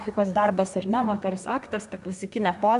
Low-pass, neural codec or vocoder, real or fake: 10.8 kHz; codec, 24 kHz, 1 kbps, SNAC; fake